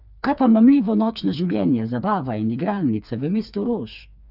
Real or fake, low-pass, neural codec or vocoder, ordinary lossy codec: fake; 5.4 kHz; codec, 16 kHz, 4 kbps, FreqCodec, smaller model; none